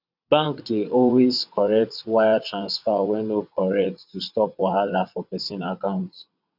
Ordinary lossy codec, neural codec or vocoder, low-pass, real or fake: none; vocoder, 22.05 kHz, 80 mel bands, WaveNeXt; 5.4 kHz; fake